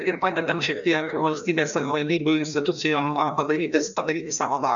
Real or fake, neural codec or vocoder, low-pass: fake; codec, 16 kHz, 1 kbps, FreqCodec, larger model; 7.2 kHz